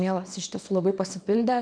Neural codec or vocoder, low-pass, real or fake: codec, 24 kHz, 0.9 kbps, WavTokenizer, small release; 9.9 kHz; fake